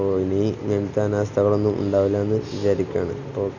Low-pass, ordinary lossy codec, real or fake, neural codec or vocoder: 7.2 kHz; none; real; none